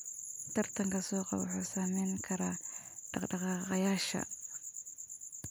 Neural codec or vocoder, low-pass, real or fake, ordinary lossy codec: none; none; real; none